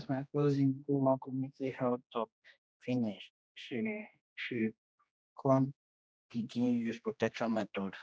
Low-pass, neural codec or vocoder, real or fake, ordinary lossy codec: none; codec, 16 kHz, 1 kbps, X-Codec, HuBERT features, trained on general audio; fake; none